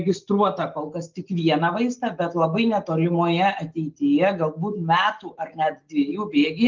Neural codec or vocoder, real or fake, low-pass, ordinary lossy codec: none; real; 7.2 kHz; Opus, 32 kbps